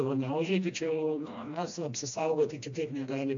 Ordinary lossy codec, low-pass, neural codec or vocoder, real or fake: AAC, 64 kbps; 7.2 kHz; codec, 16 kHz, 1 kbps, FreqCodec, smaller model; fake